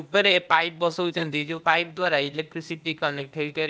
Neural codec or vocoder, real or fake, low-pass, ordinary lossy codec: codec, 16 kHz, 0.8 kbps, ZipCodec; fake; none; none